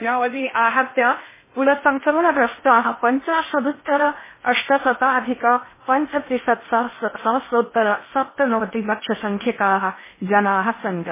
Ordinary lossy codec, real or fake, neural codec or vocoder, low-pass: MP3, 16 kbps; fake; codec, 16 kHz in and 24 kHz out, 0.6 kbps, FocalCodec, streaming, 2048 codes; 3.6 kHz